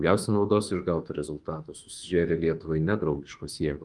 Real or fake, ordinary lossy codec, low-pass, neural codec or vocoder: fake; Opus, 24 kbps; 10.8 kHz; autoencoder, 48 kHz, 32 numbers a frame, DAC-VAE, trained on Japanese speech